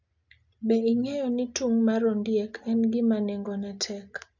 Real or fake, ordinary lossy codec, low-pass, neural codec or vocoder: real; none; 7.2 kHz; none